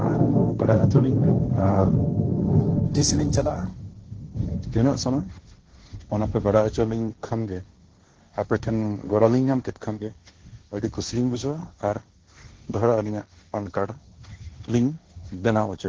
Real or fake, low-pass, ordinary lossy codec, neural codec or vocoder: fake; 7.2 kHz; Opus, 16 kbps; codec, 16 kHz, 1.1 kbps, Voila-Tokenizer